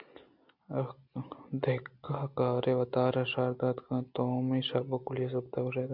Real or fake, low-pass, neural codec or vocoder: real; 5.4 kHz; none